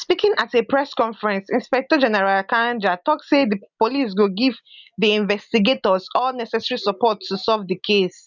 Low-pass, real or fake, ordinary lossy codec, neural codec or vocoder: 7.2 kHz; real; none; none